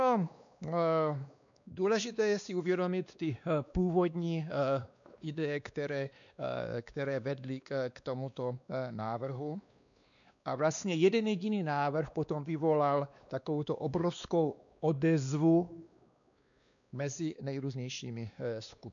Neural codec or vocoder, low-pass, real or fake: codec, 16 kHz, 2 kbps, X-Codec, WavLM features, trained on Multilingual LibriSpeech; 7.2 kHz; fake